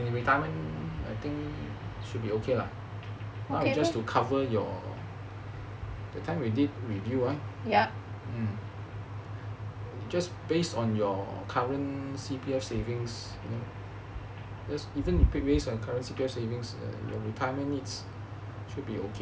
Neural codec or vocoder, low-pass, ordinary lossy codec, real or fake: none; none; none; real